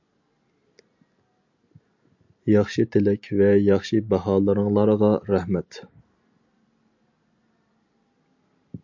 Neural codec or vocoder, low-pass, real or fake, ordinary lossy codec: none; 7.2 kHz; real; MP3, 64 kbps